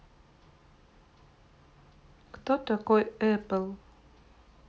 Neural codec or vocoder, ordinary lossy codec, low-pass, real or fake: none; none; none; real